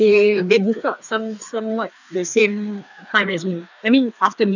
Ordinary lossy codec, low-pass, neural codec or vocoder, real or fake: none; 7.2 kHz; codec, 16 kHz, 2 kbps, FreqCodec, larger model; fake